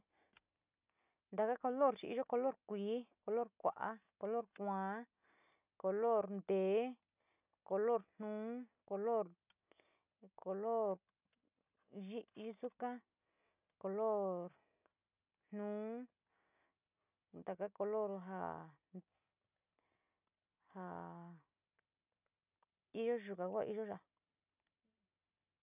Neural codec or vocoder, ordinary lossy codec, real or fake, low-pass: none; none; real; 3.6 kHz